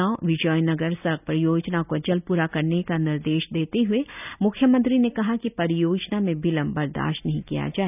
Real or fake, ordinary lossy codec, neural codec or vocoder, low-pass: real; none; none; 3.6 kHz